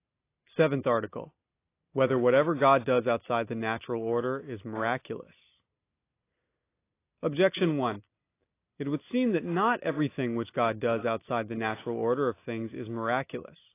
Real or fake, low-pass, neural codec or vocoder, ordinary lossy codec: real; 3.6 kHz; none; AAC, 24 kbps